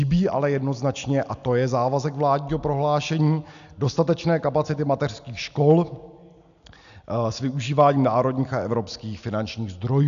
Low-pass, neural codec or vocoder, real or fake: 7.2 kHz; none; real